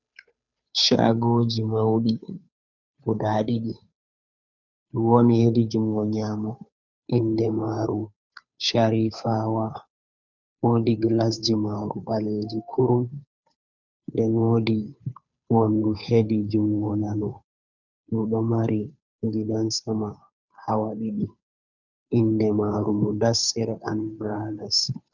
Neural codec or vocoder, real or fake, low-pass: codec, 16 kHz, 2 kbps, FunCodec, trained on Chinese and English, 25 frames a second; fake; 7.2 kHz